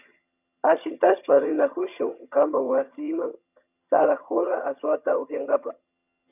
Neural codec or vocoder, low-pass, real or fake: vocoder, 22.05 kHz, 80 mel bands, HiFi-GAN; 3.6 kHz; fake